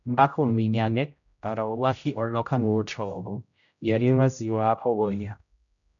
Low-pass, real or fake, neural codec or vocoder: 7.2 kHz; fake; codec, 16 kHz, 0.5 kbps, X-Codec, HuBERT features, trained on general audio